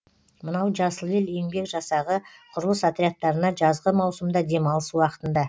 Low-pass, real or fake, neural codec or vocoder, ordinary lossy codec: none; real; none; none